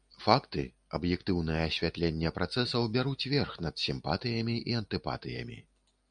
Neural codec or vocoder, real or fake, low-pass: none; real; 9.9 kHz